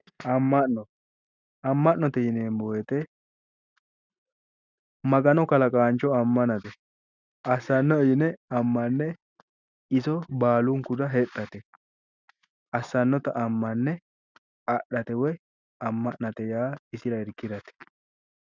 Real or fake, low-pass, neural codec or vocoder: real; 7.2 kHz; none